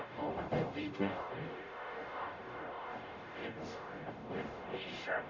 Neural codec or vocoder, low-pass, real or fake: codec, 44.1 kHz, 0.9 kbps, DAC; 7.2 kHz; fake